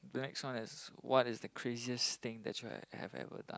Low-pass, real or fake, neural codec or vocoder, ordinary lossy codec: none; real; none; none